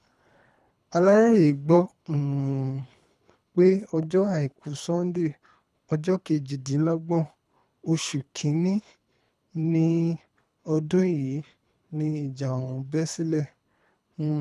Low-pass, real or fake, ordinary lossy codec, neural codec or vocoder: none; fake; none; codec, 24 kHz, 3 kbps, HILCodec